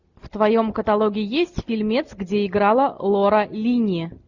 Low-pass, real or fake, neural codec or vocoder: 7.2 kHz; real; none